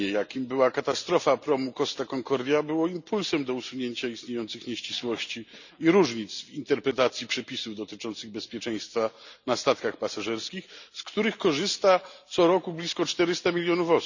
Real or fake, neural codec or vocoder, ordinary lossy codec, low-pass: real; none; none; 7.2 kHz